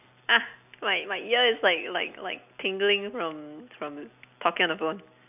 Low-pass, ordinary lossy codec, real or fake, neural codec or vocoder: 3.6 kHz; none; real; none